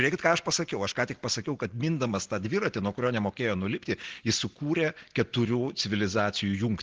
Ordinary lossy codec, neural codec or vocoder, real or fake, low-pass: Opus, 16 kbps; none; real; 7.2 kHz